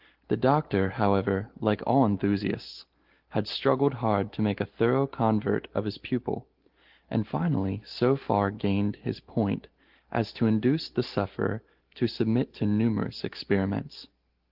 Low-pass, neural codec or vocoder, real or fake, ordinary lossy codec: 5.4 kHz; none; real; Opus, 16 kbps